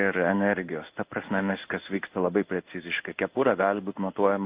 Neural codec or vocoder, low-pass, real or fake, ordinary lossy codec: codec, 16 kHz in and 24 kHz out, 1 kbps, XY-Tokenizer; 3.6 kHz; fake; Opus, 24 kbps